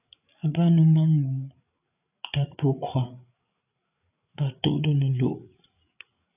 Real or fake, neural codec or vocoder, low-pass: fake; codec, 16 kHz, 8 kbps, FreqCodec, larger model; 3.6 kHz